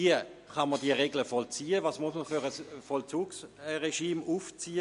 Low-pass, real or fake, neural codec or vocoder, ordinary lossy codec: 10.8 kHz; real; none; MP3, 48 kbps